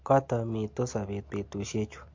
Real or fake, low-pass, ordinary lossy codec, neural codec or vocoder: real; 7.2 kHz; MP3, 48 kbps; none